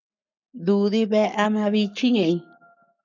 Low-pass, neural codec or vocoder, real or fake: 7.2 kHz; codec, 44.1 kHz, 7.8 kbps, Pupu-Codec; fake